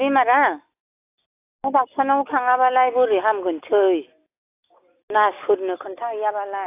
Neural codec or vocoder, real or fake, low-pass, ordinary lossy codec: none; real; 3.6 kHz; AAC, 24 kbps